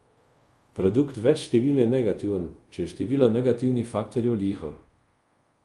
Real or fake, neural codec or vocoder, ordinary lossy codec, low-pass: fake; codec, 24 kHz, 0.5 kbps, DualCodec; Opus, 32 kbps; 10.8 kHz